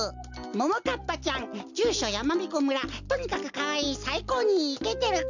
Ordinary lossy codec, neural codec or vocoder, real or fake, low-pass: none; none; real; 7.2 kHz